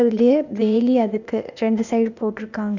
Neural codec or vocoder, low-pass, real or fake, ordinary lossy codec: codec, 16 kHz, 0.8 kbps, ZipCodec; 7.2 kHz; fake; none